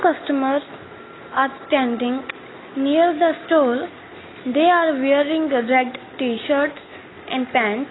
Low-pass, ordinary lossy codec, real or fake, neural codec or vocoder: 7.2 kHz; AAC, 16 kbps; real; none